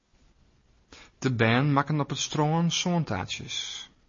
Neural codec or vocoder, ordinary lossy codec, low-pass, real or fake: none; MP3, 32 kbps; 7.2 kHz; real